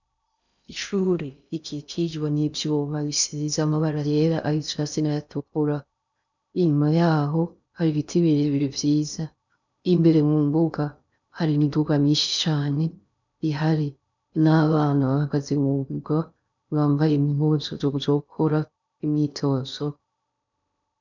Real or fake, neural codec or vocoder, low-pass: fake; codec, 16 kHz in and 24 kHz out, 0.6 kbps, FocalCodec, streaming, 2048 codes; 7.2 kHz